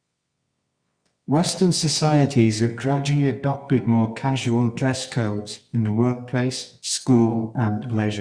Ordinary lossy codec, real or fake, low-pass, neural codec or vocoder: Opus, 64 kbps; fake; 9.9 kHz; codec, 24 kHz, 0.9 kbps, WavTokenizer, medium music audio release